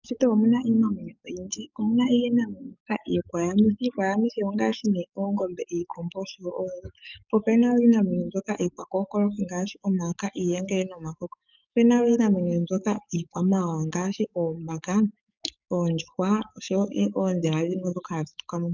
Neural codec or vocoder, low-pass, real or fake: codec, 44.1 kHz, 7.8 kbps, DAC; 7.2 kHz; fake